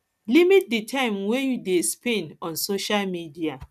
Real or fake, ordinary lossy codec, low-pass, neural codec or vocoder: real; none; 14.4 kHz; none